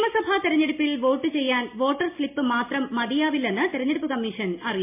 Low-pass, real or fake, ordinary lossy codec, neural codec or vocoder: 3.6 kHz; real; MP3, 24 kbps; none